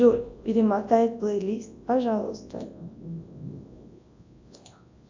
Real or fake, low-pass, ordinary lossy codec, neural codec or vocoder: fake; 7.2 kHz; AAC, 48 kbps; codec, 24 kHz, 0.9 kbps, WavTokenizer, large speech release